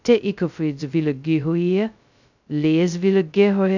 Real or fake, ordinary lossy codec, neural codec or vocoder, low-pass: fake; none; codec, 16 kHz, 0.2 kbps, FocalCodec; 7.2 kHz